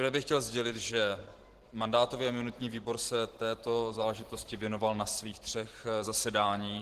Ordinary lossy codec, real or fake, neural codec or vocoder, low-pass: Opus, 16 kbps; fake; vocoder, 44.1 kHz, 128 mel bands every 512 samples, BigVGAN v2; 14.4 kHz